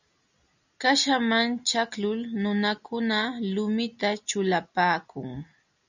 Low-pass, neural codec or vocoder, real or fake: 7.2 kHz; none; real